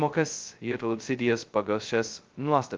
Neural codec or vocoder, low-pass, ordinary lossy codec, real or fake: codec, 16 kHz, 0.2 kbps, FocalCodec; 7.2 kHz; Opus, 32 kbps; fake